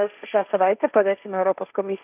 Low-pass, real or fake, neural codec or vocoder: 3.6 kHz; fake; codec, 16 kHz, 1.1 kbps, Voila-Tokenizer